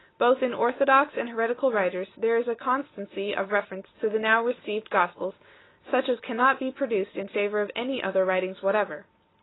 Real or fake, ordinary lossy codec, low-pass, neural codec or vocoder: real; AAC, 16 kbps; 7.2 kHz; none